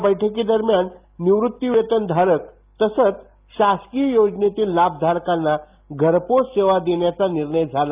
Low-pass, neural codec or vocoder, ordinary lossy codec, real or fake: 3.6 kHz; none; Opus, 24 kbps; real